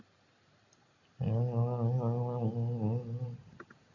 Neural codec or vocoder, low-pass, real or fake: none; 7.2 kHz; real